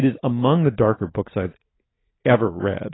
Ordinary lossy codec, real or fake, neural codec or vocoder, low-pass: AAC, 16 kbps; real; none; 7.2 kHz